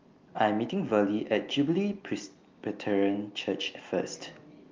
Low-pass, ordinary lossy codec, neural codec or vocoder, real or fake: 7.2 kHz; Opus, 32 kbps; none; real